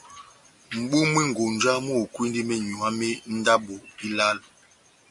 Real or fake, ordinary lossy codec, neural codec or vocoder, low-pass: real; MP3, 48 kbps; none; 10.8 kHz